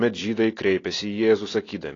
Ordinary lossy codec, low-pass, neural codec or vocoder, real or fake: AAC, 32 kbps; 7.2 kHz; none; real